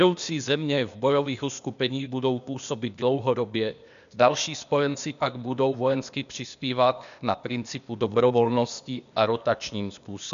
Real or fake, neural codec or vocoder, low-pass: fake; codec, 16 kHz, 0.8 kbps, ZipCodec; 7.2 kHz